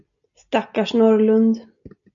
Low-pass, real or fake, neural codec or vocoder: 7.2 kHz; real; none